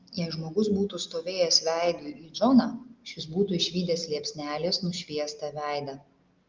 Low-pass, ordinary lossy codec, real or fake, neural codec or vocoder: 7.2 kHz; Opus, 24 kbps; real; none